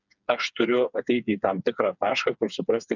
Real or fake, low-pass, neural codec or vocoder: fake; 7.2 kHz; codec, 16 kHz, 4 kbps, FreqCodec, smaller model